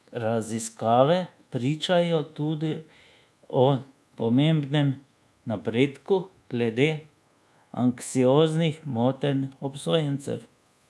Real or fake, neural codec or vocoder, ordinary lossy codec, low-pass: fake; codec, 24 kHz, 1.2 kbps, DualCodec; none; none